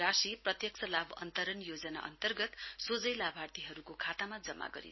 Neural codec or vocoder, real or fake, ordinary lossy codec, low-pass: none; real; MP3, 24 kbps; 7.2 kHz